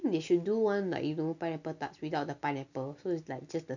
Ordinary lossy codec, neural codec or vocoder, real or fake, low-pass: none; none; real; 7.2 kHz